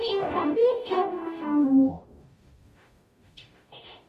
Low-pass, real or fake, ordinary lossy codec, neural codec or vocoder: 14.4 kHz; fake; Opus, 64 kbps; codec, 44.1 kHz, 0.9 kbps, DAC